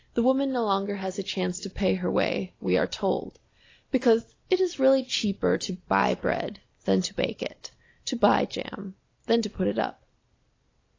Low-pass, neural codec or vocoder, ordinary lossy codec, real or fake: 7.2 kHz; none; AAC, 32 kbps; real